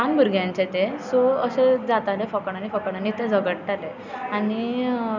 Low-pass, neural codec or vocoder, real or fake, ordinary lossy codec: 7.2 kHz; none; real; none